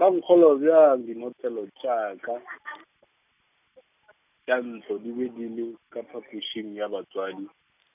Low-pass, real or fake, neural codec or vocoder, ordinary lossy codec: 3.6 kHz; real; none; none